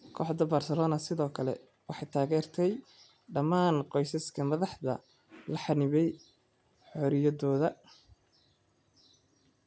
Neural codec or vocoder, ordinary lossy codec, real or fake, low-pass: none; none; real; none